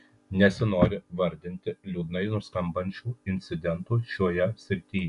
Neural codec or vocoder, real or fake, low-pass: none; real; 10.8 kHz